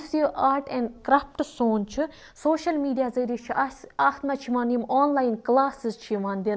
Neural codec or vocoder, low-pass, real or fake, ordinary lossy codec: none; none; real; none